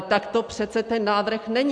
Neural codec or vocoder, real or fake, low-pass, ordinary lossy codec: none; real; 9.9 kHz; MP3, 96 kbps